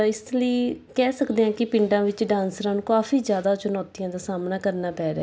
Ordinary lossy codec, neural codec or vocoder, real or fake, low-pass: none; none; real; none